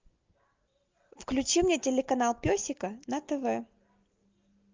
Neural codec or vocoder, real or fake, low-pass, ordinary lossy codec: none; real; 7.2 kHz; Opus, 32 kbps